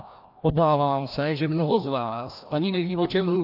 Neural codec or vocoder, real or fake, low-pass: codec, 16 kHz, 1 kbps, FreqCodec, larger model; fake; 5.4 kHz